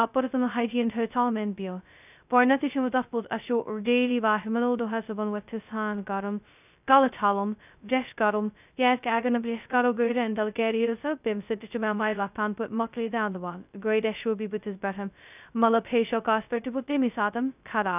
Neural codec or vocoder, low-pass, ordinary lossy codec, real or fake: codec, 16 kHz, 0.2 kbps, FocalCodec; 3.6 kHz; none; fake